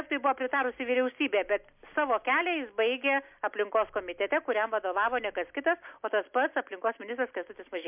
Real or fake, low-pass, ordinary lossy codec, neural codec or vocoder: real; 3.6 kHz; MP3, 32 kbps; none